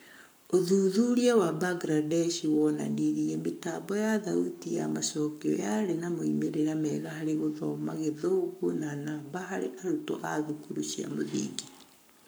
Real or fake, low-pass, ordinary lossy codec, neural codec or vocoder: fake; none; none; codec, 44.1 kHz, 7.8 kbps, Pupu-Codec